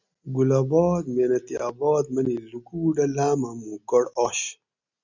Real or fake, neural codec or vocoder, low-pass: real; none; 7.2 kHz